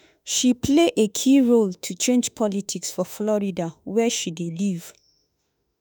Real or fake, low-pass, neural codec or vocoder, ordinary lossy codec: fake; none; autoencoder, 48 kHz, 32 numbers a frame, DAC-VAE, trained on Japanese speech; none